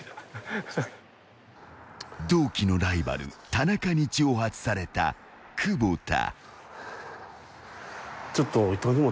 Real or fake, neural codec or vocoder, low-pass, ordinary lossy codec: real; none; none; none